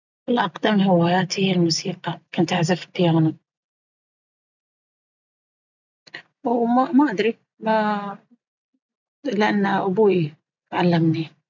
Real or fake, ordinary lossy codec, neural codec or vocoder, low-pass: real; none; none; 7.2 kHz